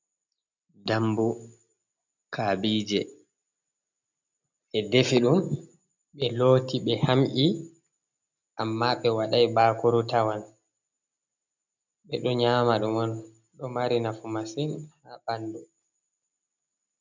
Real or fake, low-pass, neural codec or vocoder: real; 7.2 kHz; none